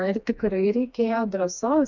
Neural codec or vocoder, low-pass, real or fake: codec, 16 kHz, 2 kbps, FreqCodec, smaller model; 7.2 kHz; fake